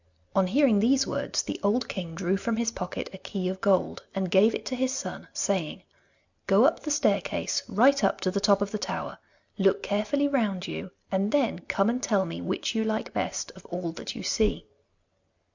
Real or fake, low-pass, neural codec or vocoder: real; 7.2 kHz; none